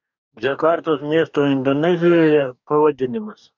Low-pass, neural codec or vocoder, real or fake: 7.2 kHz; codec, 44.1 kHz, 2.6 kbps, DAC; fake